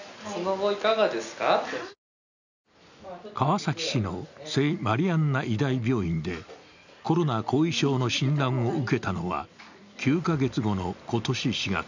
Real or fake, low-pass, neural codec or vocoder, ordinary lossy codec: real; 7.2 kHz; none; none